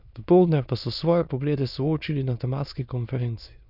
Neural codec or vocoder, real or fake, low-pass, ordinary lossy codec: autoencoder, 22.05 kHz, a latent of 192 numbers a frame, VITS, trained on many speakers; fake; 5.4 kHz; none